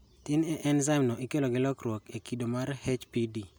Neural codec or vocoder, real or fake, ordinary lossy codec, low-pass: none; real; none; none